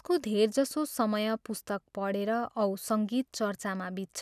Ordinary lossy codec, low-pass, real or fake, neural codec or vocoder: none; 14.4 kHz; real; none